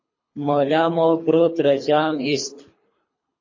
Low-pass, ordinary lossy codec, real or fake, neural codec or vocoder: 7.2 kHz; MP3, 32 kbps; fake; codec, 24 kHz, 3 kbps, HILCodec